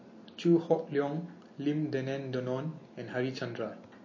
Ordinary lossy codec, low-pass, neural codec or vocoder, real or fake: MP3, 32 kbps; 7.2 kHz; none; real